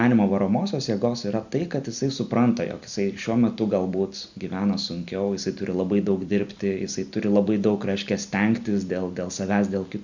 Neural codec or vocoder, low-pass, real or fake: none; 7.2 kHz; real